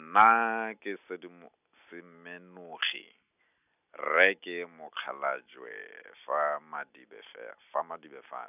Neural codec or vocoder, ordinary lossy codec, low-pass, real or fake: none; none; 3.6 kHz; real